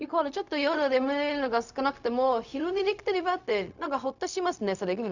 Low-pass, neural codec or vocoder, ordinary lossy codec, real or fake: 7.2 kHz; codec, 16 kHz, 0.4 kbps, LongCat-Audio-Codec; none; fake